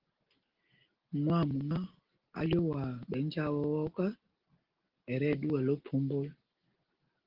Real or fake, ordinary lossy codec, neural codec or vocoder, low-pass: real; Opus, 16 kbps; none; 5.4 kHz